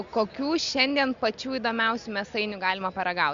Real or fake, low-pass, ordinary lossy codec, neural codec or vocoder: real; 7.2 kHz; Opus, 64 kbps; none